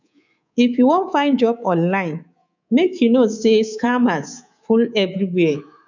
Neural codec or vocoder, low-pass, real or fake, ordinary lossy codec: codec, 24 kHz, 3.1 kbps, DualCodec; 7.2 kHz; fake; none